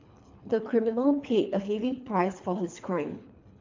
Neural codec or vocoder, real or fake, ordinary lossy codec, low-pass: codec, 24 kHz, 3 kbps, HILCodec; fake; MP3, 64 kbps; 7.2 kHz